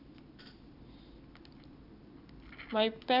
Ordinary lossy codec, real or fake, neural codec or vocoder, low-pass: none; real; none; 5.4 kHz